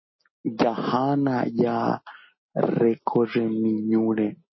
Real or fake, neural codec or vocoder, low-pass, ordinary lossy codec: real; none; 7.2 kHz; MP3, 24 kbps